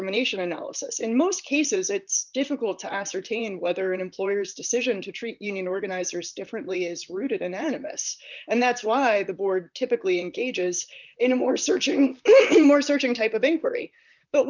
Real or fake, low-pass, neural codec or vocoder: fake; 7.2 kHz; vocoder, 44.1 kHz, 128 mel bands, Pupu-Vocoder